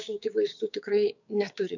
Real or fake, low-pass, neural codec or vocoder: fake; 7.2 kHz; codec, 16 kHz, 6 kbps, DAC